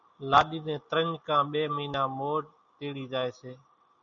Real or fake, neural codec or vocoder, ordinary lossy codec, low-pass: real; none; MP3, 96 kbps; 7.2 kHz